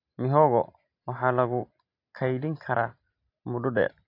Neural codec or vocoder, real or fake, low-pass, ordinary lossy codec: none; real; 5.4 kHz; AAC, 32 kbps